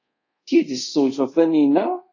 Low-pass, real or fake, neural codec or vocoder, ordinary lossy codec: 7.2 kHz; fake; codec, 24 kHz, 0.5 kbps, DualCodec; AAC, 48 kbps